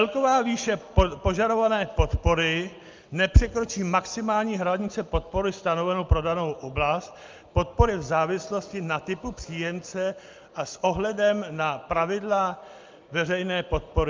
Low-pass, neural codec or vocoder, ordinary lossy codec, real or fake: 7.2 kHz; none; Opus, 24 kbps; real